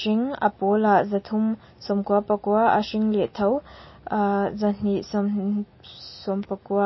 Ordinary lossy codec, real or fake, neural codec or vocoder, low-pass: MP3, 24 kbps; real; none; 7.2 kHz